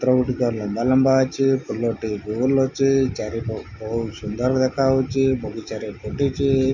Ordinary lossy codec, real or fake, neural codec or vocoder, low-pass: none; real; none; 7.2 kHz